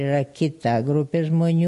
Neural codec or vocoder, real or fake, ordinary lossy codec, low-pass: none; real; MP3, 48 kbps; 14.4 kHz